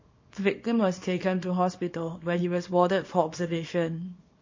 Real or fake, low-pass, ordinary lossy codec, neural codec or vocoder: fake; 7.2 kHz; MP3, 32 kbps; codec, 24 kHz, 0.9 kbps, WavTokenizer, small release